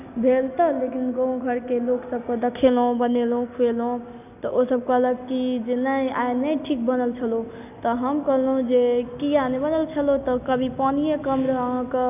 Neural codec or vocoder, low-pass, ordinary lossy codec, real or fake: none; 3.6 kHz; none; real